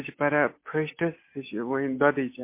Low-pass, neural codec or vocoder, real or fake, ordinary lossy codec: 3.6 kHz; none; real; MP3, 24 kbps